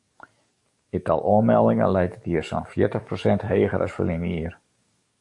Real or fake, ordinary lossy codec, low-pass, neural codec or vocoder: fake; AAC, 64 kbps; 10.8 kHz; codec, 44.1 kHz, 7.8 kbps, DAC